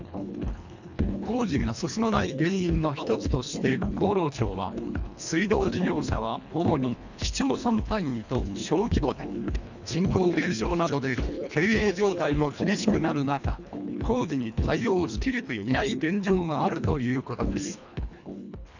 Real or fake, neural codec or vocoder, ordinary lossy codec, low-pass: fake; codec, 24 kHz, 1.5 kbps, HILCodec; none; 7.2 kHz